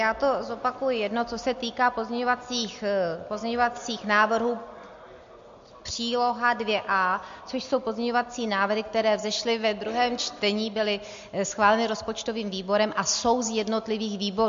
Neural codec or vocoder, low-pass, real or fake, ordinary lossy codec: none; 7.2 kHz; real; MP3, 48 kbps